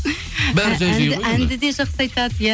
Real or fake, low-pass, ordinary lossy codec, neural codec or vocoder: real; none; none; none